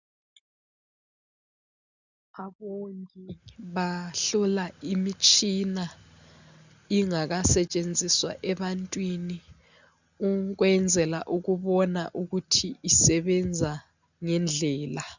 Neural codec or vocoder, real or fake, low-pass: none; real; 7.2 kHz